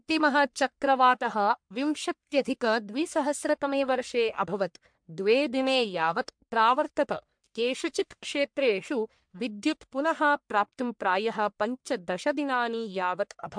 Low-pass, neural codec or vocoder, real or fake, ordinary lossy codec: 9.9 kHz; codec, 44.1 kHz, 1.7 kbps, Pupu-Codec; fake; MP3, 64 kbps